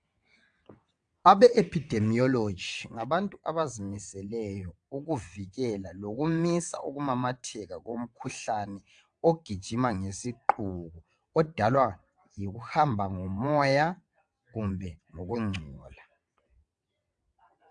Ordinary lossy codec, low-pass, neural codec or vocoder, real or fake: AAC, 64 kbps; 9.9 kHz; vocoder, 22.05 kHz, 80 mel bands, WaveNeXt; fake